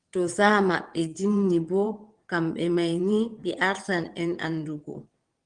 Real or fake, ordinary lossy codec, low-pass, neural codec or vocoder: fake; Opus, 24 kbps; 9.9 kHz; vocoder, 22.05 kHz, 80 mel bands, WaveNeXt